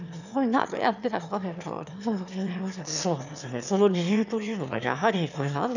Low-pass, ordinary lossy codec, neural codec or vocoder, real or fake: 7.2 kHz; none; autoencoder, 22.05 kHz, a latent of 192 numbers a frame, VITS, trained on one speaker; fake